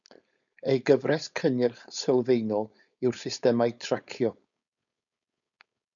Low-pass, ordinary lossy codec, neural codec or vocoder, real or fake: 7.2 kHz; MP3, 96 kbps; codec, 16 kHz, 4.8 kbps, FACodec; fake